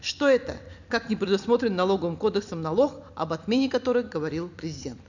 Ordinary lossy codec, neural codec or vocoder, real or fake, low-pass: none; vocoder, 44.1 kHz, 128 mel bands every 256 samples, BigVGAN v2; fake; 7.2 kHz